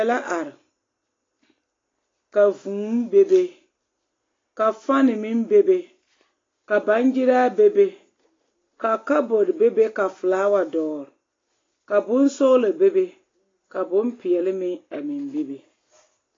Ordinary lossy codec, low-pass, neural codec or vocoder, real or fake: AAC, 32 kbps; 7.2 kHz; none; real